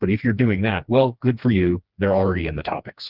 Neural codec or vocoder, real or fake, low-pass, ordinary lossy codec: codec, 16 kHz, 2 kbps, FreqCodec, smaller model; fake; 5.4 kHz; Opus, 32 kbps